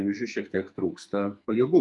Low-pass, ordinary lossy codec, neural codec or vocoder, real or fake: 10.8 kHz; MP3, 96 kbps; codec, 44.1 kHz, 2.6 kbps, SNAC; fake